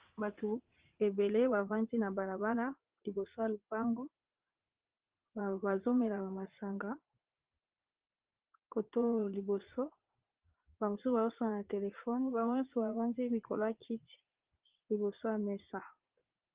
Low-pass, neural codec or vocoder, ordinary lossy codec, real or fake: 3.6 kHz; vocoder, 44.1 kHz, 80 mel bands, Vocos; Opus, 16 kbps; fake